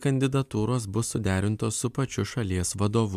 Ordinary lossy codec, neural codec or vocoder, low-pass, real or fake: MP3, 96 kbps; none; 14.4 kHz; real